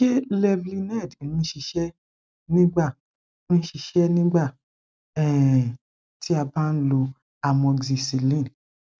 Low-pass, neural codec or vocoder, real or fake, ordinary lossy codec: none; none; real; none